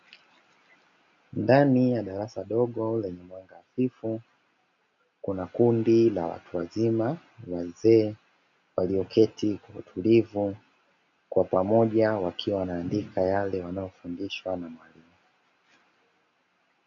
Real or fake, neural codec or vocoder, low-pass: real; none; 7.2 kHz